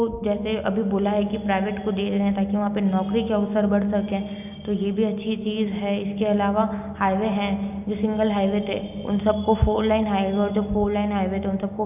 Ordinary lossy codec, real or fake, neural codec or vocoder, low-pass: none; real; none; 3.6 kHz